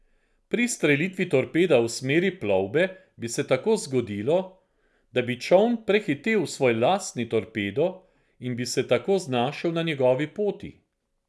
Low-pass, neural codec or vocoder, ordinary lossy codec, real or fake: none; none; none; real